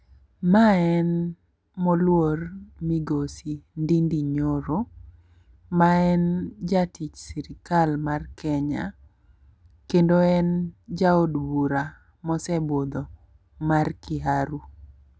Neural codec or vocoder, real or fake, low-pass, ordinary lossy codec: none; real; none; none